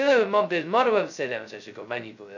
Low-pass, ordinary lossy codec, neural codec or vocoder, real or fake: 7.2 kHz; none; codec, 16 kHz, 0.2 kbps, FocalCodec; fake